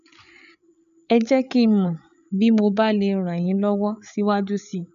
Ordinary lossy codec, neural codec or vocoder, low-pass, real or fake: none; codec, 16 kHz, 8 kbps, FreqCodec, larger model; 7.2 kHz; fake